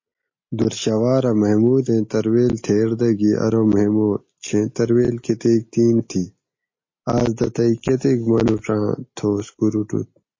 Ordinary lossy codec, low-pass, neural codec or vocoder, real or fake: MP3, 32 kbps; 7.2 kHz; none; real